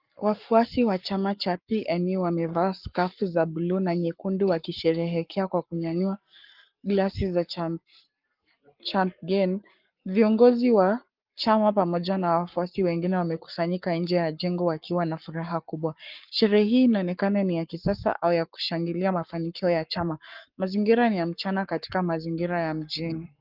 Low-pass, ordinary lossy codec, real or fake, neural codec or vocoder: 5.4 kHz; Opus, 32 kbps; fake; codec, 44.1 kHz, 7.8 kbps, Pupu-Codec